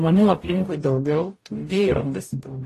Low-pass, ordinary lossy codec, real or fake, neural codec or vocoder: 14.4 kHz; AAC, 48 kbps; fake; codec, 44.1 kHz, 0.9 kbps, DAC